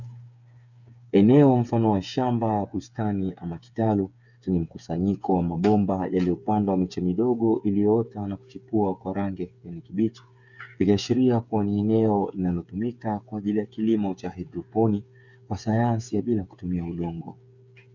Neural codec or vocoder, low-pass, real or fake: codec, 16 kHz, 8 kbps, FreqCodec, smaller model; 7.2 kHz; fake